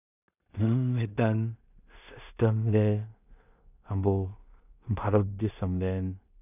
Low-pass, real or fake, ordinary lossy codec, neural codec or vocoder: 3.6 kHz; fake; none; codec, 16 kHz in and 24 kHz out, 0.4 kbps, LongCat-Audio-Codec, two codebook decoder